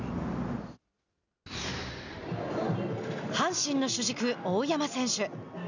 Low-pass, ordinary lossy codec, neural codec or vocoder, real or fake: 7.2 kHz; none; none; real